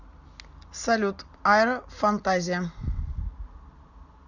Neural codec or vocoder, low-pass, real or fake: none; 7.2 kHz; real